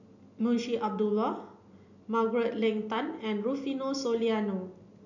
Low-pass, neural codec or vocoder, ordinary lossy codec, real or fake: 7.2 kHz; none; none; real